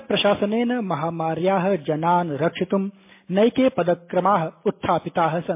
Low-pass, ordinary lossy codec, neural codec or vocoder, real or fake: 3.6 kHz; MP3, 24 kbps; none; real